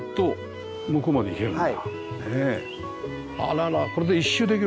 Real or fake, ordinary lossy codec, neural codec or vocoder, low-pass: real; none; none; none